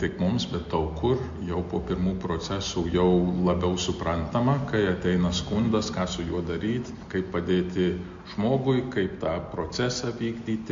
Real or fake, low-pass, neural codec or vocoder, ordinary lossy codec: real; 7.2 kHz; none; MP3, 48 kbps